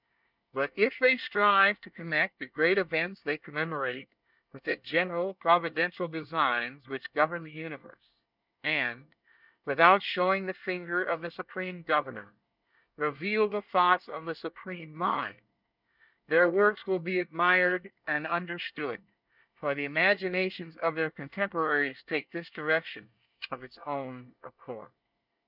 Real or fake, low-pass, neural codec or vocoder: fake; 5.4 kHz; codec, 24 kHz, 1 kbps, SNAC